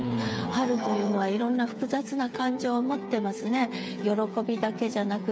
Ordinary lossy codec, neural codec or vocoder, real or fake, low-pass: none; codec, 16 kHz, 8 kbps, FreqCodec, smaller model; fake; none